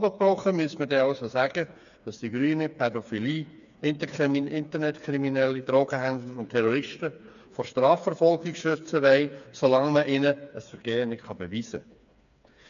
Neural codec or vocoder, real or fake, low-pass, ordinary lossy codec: codec, 16 kHz, 4 kbps, FreqCodec, smaller model; fake; 7.2 kHz; none